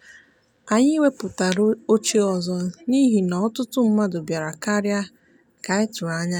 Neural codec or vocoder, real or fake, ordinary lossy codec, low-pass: none; real; none; 19.8 kHz